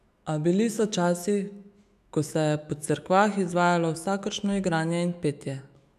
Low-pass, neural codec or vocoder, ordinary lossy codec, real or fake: 14.4 kHz; autoencoder, 48 kHz, 128 numbers a frame, DAC-VAE, trained on Japanese speech; none; fake